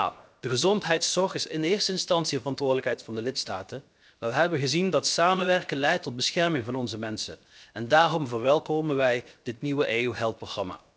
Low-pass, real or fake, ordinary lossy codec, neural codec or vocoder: none; fake; none; codec, 16 kHz, 0.7 kbps, FocalCodec